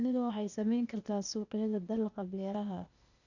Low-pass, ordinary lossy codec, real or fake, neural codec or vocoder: 7.2 kHz; none; fake; codec, 16 kHz, 0.8 kbps, ZipCodec